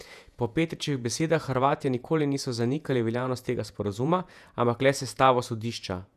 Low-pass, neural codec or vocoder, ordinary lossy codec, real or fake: 14.4 kHz; vocoder, 48 kHz, 128 mel bands, Vocos; none; fake